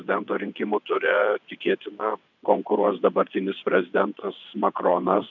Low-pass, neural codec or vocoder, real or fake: 7.2 kHz; vocoder, 22.05 kHz, 80 mel bands, WaveNeXt; fake